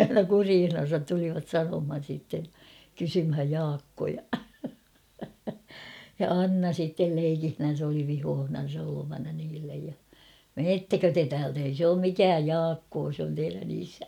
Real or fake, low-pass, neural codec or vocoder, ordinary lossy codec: real; 19.8 kHz; none; none